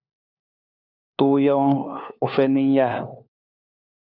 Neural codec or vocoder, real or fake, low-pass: codec, 16 kHz, 4 kbps, FunCodec, trained on LibriTTS, 50 frames a second; fake; 5.4 kHz